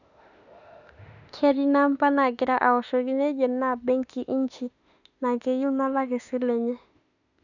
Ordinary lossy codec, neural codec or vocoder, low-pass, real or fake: none; autoencoder, 48 kHz, 32 numbers a frame, DAC-VAE, trained on Japanese speech; 7.2 kHz; fake